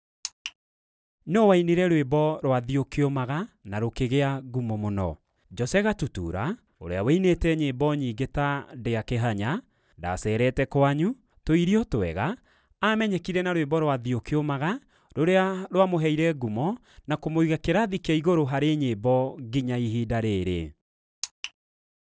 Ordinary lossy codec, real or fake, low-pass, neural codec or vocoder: none; real; none; none